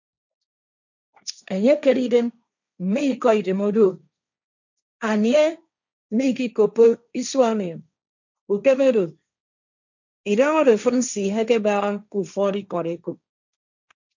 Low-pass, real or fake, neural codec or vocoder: 7.2 kHz; fake; codec, 16 kHz, 1.1 kbps, Voila-Tokenizer